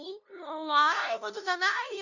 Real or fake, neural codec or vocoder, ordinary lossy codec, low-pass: fake; codec, 16 kHz, 0.5 kbps, FunCodec, trained on LibriTTS, 25 frames a second; none; 7.2 kHz